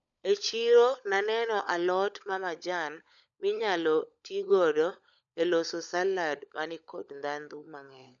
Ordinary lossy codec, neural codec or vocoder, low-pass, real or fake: none; codec, 16 kHz, 16 kbps, FunCodec, trained on LibriTTS, 50 frames a second; 7.2 kHz; fake